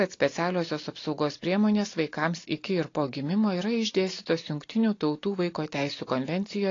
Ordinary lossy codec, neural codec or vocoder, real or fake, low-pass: AAC, 32 kbps; none; real; 7.2 kHz